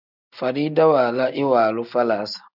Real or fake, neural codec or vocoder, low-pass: real; none; 5.4 kHz